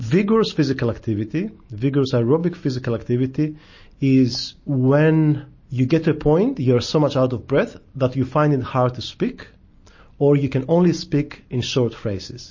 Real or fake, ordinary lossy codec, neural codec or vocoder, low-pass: real; MP3, 32 kbps; none; 7.2 kHz